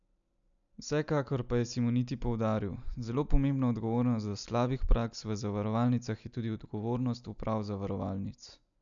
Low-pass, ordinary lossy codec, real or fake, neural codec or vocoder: 7.2 kHz; MP3, 96 kbps; real; none